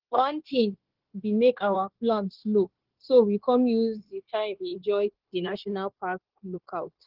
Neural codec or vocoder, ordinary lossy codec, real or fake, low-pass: codec, 16 kHz, 0.9 kbps, LongCat-Audio-Codec; Opus, 16 kbps; fake; 5.4 kHz